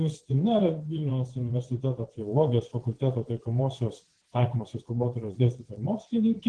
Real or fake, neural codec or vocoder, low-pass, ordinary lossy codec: fake; vocoder, 22.05 kHz, 80 mel bands, WaveNeXt; 9.9 kHz; Opus, 16 kbps